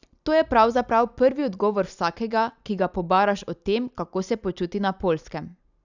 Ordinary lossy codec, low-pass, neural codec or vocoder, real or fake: none; 7.2 kHz; none; real